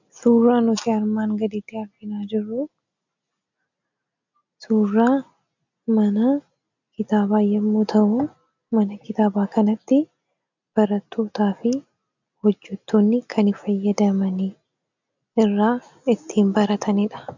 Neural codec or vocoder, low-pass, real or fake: none; 7.2 kHz; real